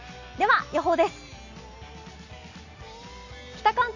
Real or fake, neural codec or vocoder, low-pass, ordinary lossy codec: real; none; 7.2 kHz; none